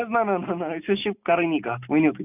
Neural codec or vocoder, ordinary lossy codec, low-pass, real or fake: none; none; 3.6 kHz; real